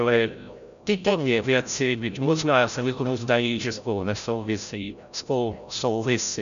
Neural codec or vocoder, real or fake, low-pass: codec, 16 kHz, 0.5 kbps, FreqCodec, larger model; fake; 7.2 kHz